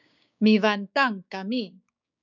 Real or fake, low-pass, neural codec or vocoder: fake; 7.2 kHz; codec, 16 kHz, 6 kbps, DAC